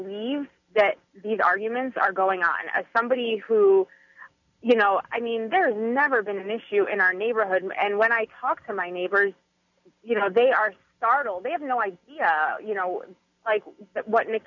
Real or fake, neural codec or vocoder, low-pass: real; none; 7.2 kHz